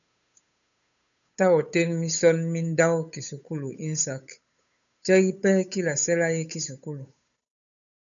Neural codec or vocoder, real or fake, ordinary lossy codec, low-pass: codec, 16 kHz, 8 kbps, FunCodec, trained on Chinese and English, 25 frames a second; fake; MP3, 96 kbps; 7.2 kHz